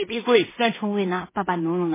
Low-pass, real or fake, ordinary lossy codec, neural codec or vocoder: 3.6 kHz; fake; MP3, 16 kbps; codec, 16 kHz in and 24 kHz out, 0.4 kbps, LongCat-Audio-Codec, two codebook decoder